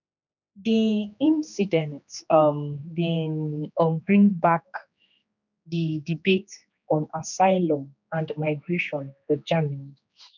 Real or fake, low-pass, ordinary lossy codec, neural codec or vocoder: fake; 7.2 kHz; none; codec, 16 kHz, 2 kbps, X-Codec, HuBERT features, trained on general audio